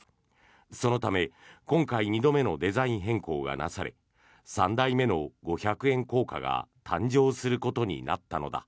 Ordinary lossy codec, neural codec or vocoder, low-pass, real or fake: none; none; none; real